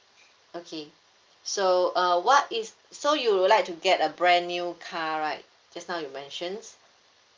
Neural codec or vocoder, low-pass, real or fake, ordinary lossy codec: none; 7.2 kHz; real; Opus, 32 kbps